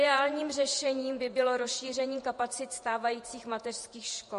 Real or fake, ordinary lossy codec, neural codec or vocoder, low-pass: fake; MP3, 48 kbps; vocoder, 48 kHz, 128 mel bands, Vocos; 14.4 kHz